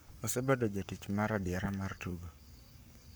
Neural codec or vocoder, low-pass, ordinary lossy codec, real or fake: codec, 44.1 kHz, 7.8 kbps, Pupu-Codec; none; none; fake